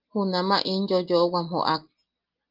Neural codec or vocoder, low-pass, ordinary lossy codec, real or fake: none; 5.4 kHz; Opus, 32 kbps; real